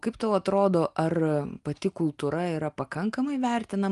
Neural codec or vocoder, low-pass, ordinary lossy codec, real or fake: none; 10.8 kHz; Opus, 32 kbps; real